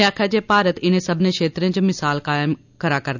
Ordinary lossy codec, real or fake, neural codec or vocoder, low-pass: none; real; none; 7.2 kHz